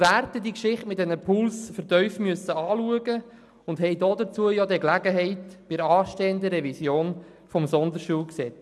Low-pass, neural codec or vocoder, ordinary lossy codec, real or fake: none; none; none; real